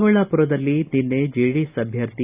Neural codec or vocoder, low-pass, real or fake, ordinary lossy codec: none; 3.6 kHz; real; Opus, 64 kbps